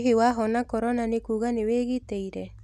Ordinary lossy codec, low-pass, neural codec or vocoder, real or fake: none; 14.4 kHz; none; real